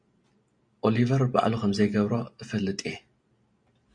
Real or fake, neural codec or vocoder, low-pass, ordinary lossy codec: real; none; 9.9 kHz; MP3, 96 kbps